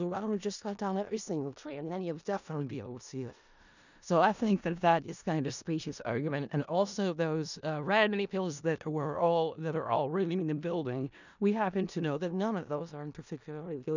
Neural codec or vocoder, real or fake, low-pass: codec, 16 kHz in and 24 kHz out, 0.4 kbps, LongCat-Audio-Codec, four codebook decoder; fake; 7.2 kHz